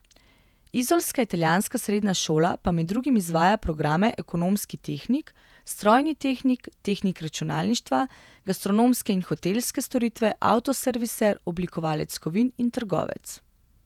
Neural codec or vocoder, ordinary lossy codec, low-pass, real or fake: vocoder, 48 kHz, 128 mel bands, Vocos; none; 19.8 kHz; fake